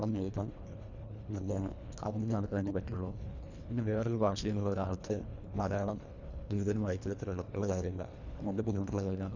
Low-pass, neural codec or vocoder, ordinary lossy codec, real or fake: 7.2 kHz; codec, 24 kHz, 1.5 kbps, HILCodec; none; fake